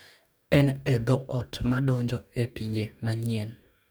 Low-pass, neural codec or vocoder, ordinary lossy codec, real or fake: none; codec, 44.1 kHz, 2.6 kbps, DAC; none; fake